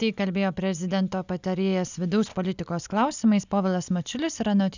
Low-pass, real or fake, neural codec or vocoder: 7.2 kHz; real; none